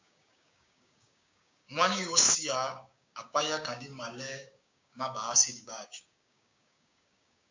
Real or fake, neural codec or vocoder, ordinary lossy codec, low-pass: fake; codec, 16 kHz, 6 kbps, DAC; MP3, 64 kbps; 7.2 kHz